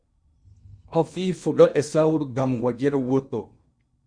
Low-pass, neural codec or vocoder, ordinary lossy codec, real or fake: 9.9 kHz; codec, 16 kHz in and 24 kHz out, 0.8 kbps, FocalCodec, streaming, 65536 codes; Opus, 64 kbps; fake